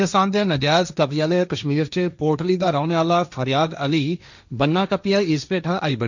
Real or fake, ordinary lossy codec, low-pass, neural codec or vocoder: fake; none; 7.2 kHz; codec, 16 kHz, 1.1 kbps, Voila-Tokenizer